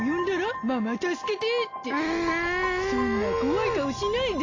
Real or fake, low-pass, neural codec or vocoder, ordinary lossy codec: real; 7.2 kHz; none; AAC, 32 kbps